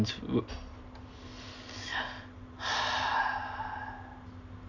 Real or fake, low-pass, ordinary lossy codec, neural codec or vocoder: real; 7.2 kHz; none; none